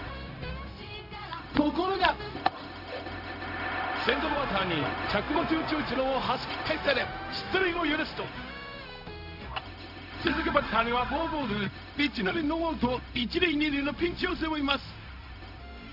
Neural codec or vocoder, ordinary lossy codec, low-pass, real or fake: codec, 16 kHz, 0.4 kbps, LongCat-Audio-Codec; none; 5.4 kHz; fake